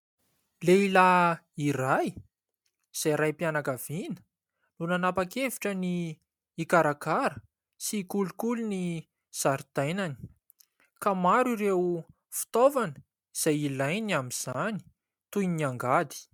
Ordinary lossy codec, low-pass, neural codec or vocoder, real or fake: MP3, 96 kbps; 19.8 kHz; none; real